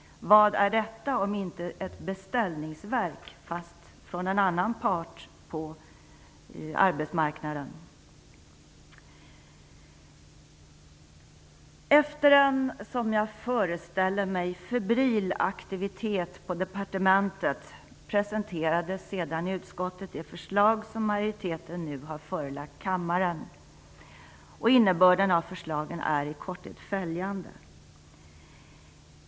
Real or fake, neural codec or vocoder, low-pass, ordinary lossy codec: real; none; none; none